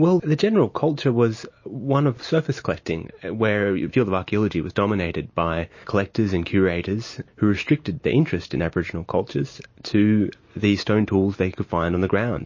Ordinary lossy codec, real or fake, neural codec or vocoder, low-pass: MP3, 32 kbps; real; none; 7.2 kHz